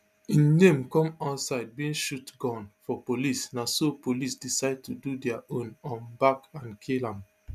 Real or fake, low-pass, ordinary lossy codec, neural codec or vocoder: real; 14.4 kHz; none; none